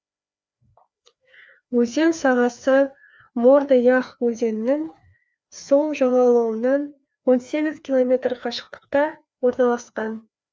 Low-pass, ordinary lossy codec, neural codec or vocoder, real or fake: none; none; codec, 16 kHz, 2 kbps, FreqCodec, larger model; fake